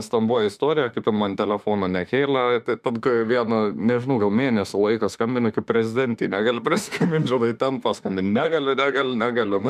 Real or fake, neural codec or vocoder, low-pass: fake; autoencoder, 48 kHz, 32 numbers a frame, DAC-VAE, trained on Japanese speech; 14.4 kHz